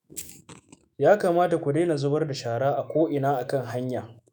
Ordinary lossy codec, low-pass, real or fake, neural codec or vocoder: none; none; fake; autoencoder, 48 kHz, 128 numbers a frame, DAC-VAE, trained on Japanese speech